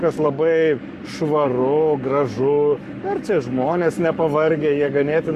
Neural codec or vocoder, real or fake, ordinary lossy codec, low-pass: codec, 44.1 kHz, 7.8 kbps, Pupu-Codec; fake; Opus, 64 kbps; 14.4 kHz